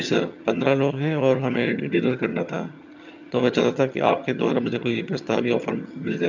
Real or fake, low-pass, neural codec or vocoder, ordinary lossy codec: fake; 7.2 kHz; vocoder, 22.05 kHz, 80 mel bands, HiFi-GAN; none